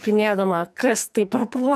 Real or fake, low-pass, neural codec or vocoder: fake; 14.4 kHz; codec, 44.1 kHz, 2.6 kbps, SNAC